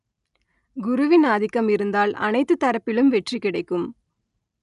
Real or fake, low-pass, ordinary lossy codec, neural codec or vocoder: real; 10.8 kHz; none; none